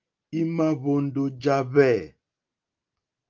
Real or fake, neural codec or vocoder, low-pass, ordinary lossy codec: real; none; 7.2 kHz; Opus, 32 kbps